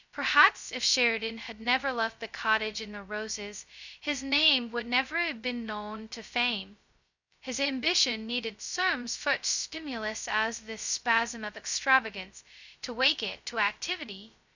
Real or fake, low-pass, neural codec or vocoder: fake; 7.2 kHz; codec, 16 kHz, 0.2 kbps, FocalCodec